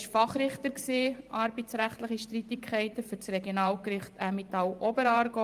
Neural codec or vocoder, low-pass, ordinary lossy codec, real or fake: vocoder, 44.1 kHz, 128 mel bands every 512 samples, BigVGAN v2; 14.4 kHz; Opus, 32 kbps; fake